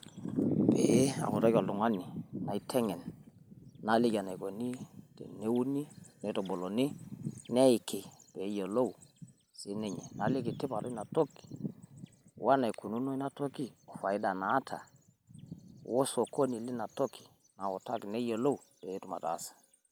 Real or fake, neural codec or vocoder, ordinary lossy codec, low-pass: real; none; none; none